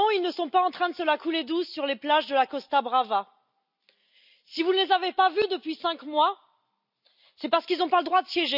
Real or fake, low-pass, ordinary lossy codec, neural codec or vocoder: real; 5.4 kHz; none; none